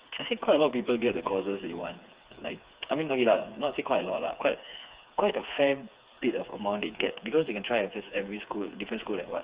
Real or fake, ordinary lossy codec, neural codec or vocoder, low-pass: fake; Opus, 64 kbps; codec, 16 kHz, 4 kbps, FreqCodec, smaller model; 3.6 kHz